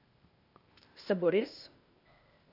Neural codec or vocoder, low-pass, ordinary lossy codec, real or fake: codec, 16 kHz, 0.8 kbps, ZipCodec; 5.4 kHz; none; fake